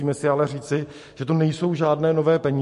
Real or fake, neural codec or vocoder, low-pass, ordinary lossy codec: real; none; 14.4 kHz; MP3, 48 kbps